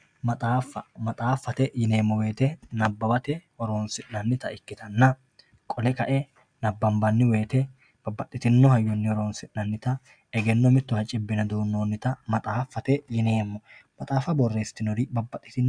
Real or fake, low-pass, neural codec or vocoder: real; 9.9 kHz; none